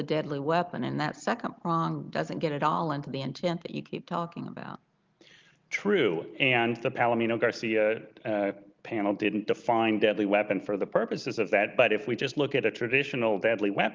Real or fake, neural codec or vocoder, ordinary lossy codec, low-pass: real; none; Opus, 32 kbps; 7.2 kHz